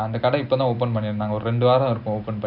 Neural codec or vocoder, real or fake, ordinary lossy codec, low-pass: none; real; none; 5.4 kHz